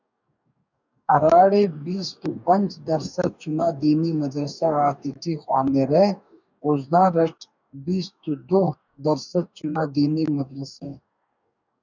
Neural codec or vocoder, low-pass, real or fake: codec, 44.1 kHz, 2.6 kbps, DAC; 7.2 kHz; fake